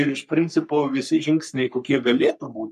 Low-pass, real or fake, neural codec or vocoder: 14.4 kHz; fake; codec, 44.1 kHz, 3.4 kbps, Pupu-Codec